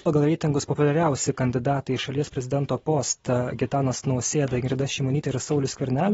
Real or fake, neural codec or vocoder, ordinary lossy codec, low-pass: real; none; AAC, 24 kbps; 19.8 kHz